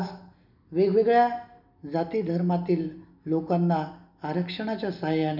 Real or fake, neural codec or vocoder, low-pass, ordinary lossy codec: real; none; 5.4 kHz; none